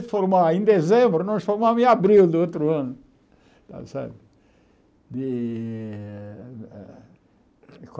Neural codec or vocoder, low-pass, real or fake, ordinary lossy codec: none; none; real; none